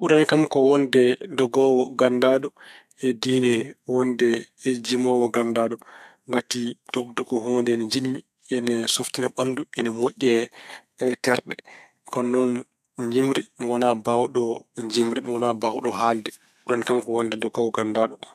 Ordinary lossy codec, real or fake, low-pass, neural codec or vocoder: none; fake; 14.4 kHz; codec, 32 kHz, 1.9 kbps, SNAC